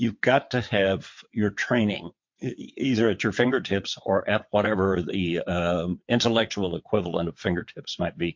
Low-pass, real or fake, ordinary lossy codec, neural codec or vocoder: 7.2 kHz; fake; MP3, 48 kbps; vocoder, 22.05 kHz, 80 mel bands, WaveNeXt